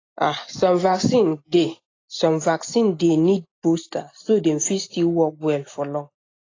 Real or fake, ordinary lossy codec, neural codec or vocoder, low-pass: real; AAC, 32 kbps; none; 7.2 kHz